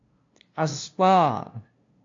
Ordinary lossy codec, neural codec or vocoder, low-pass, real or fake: AAC, 48 kbps; codec, 16 kHz, 0.5 kbps, FunCodec, trained on LibriTTS, 25 frames a second; 7.2 kHz; fake